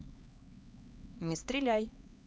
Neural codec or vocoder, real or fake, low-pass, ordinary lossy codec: codec, 16 kHz, 2 kbps, X-Codec, HuBERT features, trained on LibriSpeech; fake; none; none